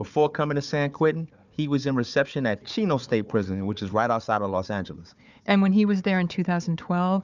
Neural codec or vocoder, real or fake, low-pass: codec, 16 kHz, 4 kbps, FunCodec, trained on Chinese and English, 50 frames a second; fake; 7.2 kHz